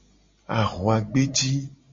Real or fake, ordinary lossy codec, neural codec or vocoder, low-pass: real; MP3, 32 kbps; none; 7.2 kHz